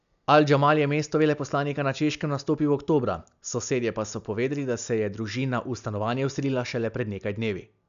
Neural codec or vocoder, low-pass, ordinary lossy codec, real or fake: none; 7.2 kHz; none; real